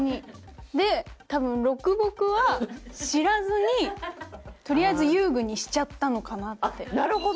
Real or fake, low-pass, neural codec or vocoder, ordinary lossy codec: real; none; none; none